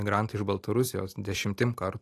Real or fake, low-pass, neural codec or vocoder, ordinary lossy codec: real; 14.4 kHz; none; AAC, 48 kbps